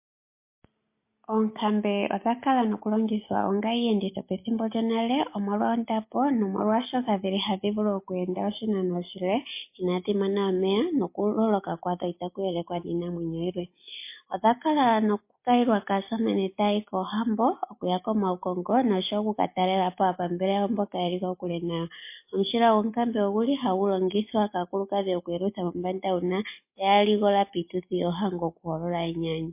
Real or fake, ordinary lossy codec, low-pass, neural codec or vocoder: real; MP3, 24 kbps; 3.6 kHz; none